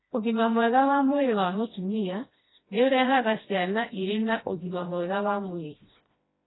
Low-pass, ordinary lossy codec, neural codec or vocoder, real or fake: 7.2 kHz; AAC, 16 kbps; codec, 16 kHz, 1 kbps, FreqCodec, smaller model; fake